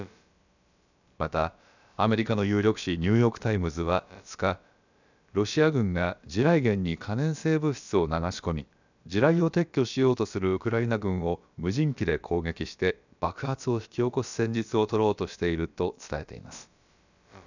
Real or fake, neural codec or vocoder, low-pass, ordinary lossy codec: fake; codec, 16 kHz, about 1 kbps, DyCAST, with the encoder's durations; 7.2 kHz; none